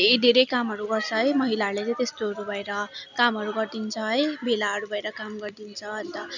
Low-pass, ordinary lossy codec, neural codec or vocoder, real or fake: 7.2 kHz; none; none; real